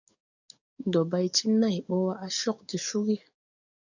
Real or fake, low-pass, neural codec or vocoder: fake; 7.2 kHz; codec, 44.1 kHz, 7.8 kbps, DAC